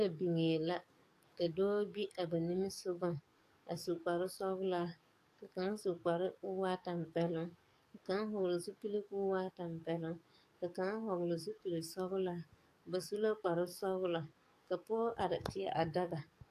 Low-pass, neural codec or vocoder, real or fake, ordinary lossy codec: 14.4 kHz; codec, 44.1 kHz, 7.8 kbps, DAC; fake; AAC, 64 kbps